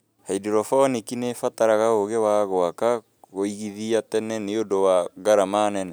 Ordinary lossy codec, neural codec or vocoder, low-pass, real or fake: none; none; none; real